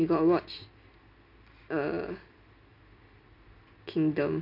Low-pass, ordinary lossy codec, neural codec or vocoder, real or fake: 5.4 kHz; none; none; real